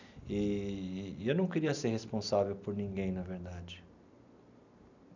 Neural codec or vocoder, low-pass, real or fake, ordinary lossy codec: none; 7.2 kHz; real; none